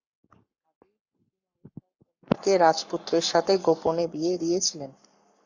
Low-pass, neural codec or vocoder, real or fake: 7.2 kHz; codec, 44.1 kHz, 7.8 kbps, Pupu-Codec; fake